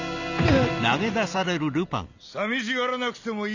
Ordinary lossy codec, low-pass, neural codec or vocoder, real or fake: none; 7.2 kHz; none; real